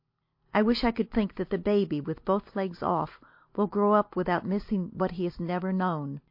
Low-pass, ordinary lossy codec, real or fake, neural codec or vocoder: 5.4 kHz; MP3, 32 kbps; real; none